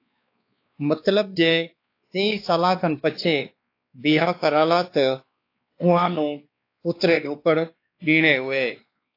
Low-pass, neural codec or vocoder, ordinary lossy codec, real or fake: 5.4 kHz; codec, 16 kHz, 2 kbps, X-Codec, WavLM features, trained on Multilingual LibriSpeech; AAC, 32 kbps; fake